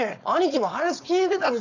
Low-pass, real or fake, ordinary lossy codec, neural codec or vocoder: 7.2 kHz; fake; none; codec, 16 kHz, 4.8 kbps, FACodec